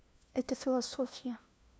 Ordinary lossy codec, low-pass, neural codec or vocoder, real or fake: none; none; codec, 16 kHz, 1 kbps, FunCodec, trained on LibriTTS, 50 frames a second; fake